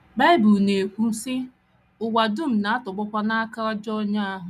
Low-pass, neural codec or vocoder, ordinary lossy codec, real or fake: 14.4 kHz; none; none; real